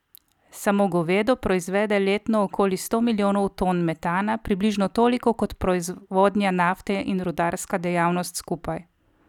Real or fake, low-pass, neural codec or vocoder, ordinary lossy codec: real; 19.8 kHz; none; none